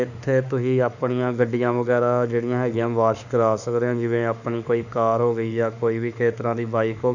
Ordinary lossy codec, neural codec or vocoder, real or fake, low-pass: none; autoencoder, 48 kHz, 32 numbers a frame, DAC-VAE, trained on Japanese speech; fake; 7.2 kHz